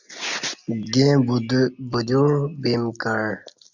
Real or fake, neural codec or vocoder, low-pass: real; none; 7.2 kHz